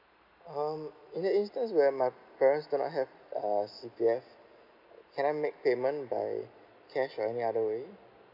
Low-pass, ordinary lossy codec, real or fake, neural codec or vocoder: 5.4 kHz; none; real; none